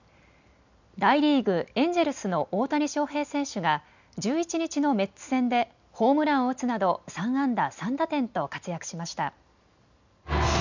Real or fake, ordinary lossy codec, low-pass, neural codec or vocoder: real; none; 7.2 kHz; none